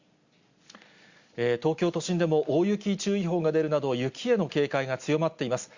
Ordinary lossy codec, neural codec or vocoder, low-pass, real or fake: Opus, 64 kbps; none; 7.2 kHz; real